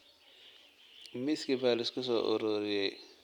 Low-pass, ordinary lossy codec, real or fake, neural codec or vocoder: 19.8 kHz; none; real; none